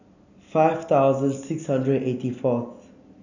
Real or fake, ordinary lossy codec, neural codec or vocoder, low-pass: real; none; none; 7.2 kHz